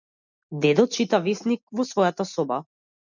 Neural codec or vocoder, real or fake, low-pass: none; real; 7.2 kHz